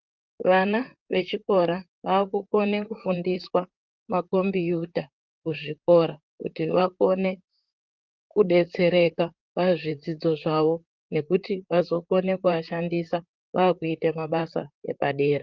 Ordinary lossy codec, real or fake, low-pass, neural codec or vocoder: Opus, 32 kbps; fake; 7.2 kHz; vocoder, 44.1 kHz, 128 mel bands, Pupu-Vocoder